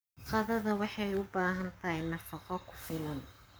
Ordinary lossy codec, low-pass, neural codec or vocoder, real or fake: none; none; codec, 44.1 kHz, 7.8 kbps, Pupu-Codec; fake